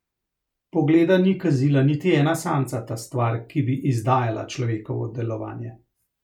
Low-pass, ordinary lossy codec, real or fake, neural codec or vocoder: 19.8 kHz; none; real; none